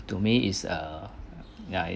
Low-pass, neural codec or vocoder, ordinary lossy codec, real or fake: none; none; none; real